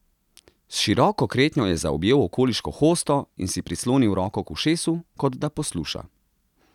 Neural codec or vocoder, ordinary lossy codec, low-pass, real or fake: none; none; 19.8 kHz; real